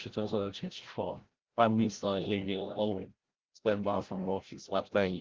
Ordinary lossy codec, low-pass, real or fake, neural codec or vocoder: Opus, 16 kbps; 7.2 kHz; fake; codec, 16 kHz, 0.5 kbps, FreqCodec, larger model